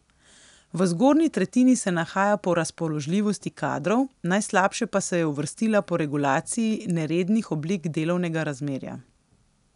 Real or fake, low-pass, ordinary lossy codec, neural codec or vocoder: real; 10.8 kHz; none; none